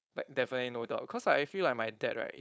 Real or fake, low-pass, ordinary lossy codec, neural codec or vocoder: fake; none; none; codec, 16 kHz, 4.8 kbps, FACodec